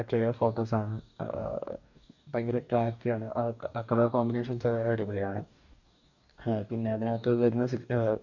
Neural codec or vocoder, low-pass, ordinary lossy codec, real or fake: codec, 44.1 kHz, 2.6 kbps, DAC; 7.2 kHz; none; fake